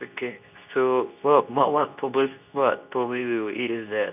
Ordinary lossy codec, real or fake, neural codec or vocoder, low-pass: none; fake; codec, 24 kHz, 0.9 kbps, WavTokenizer, medium speech release version 2; 3.6 kHz